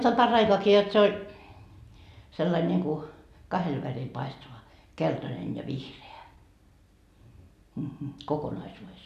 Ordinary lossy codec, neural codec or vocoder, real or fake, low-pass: MP3, 96 kbps; none; real; 14.4 kHz